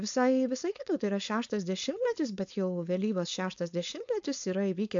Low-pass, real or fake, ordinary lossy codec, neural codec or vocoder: 7.2 kHz; fake; AAC, 64 kbps; codec, 16 kHz, 4.8 kbps, FACodec